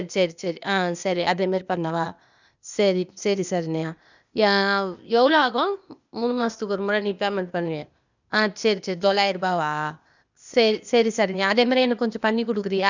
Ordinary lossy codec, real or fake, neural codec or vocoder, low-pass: none; fake; codec, 16 kHz, 0.8 kbps, ZipCodec; 7.2 kHz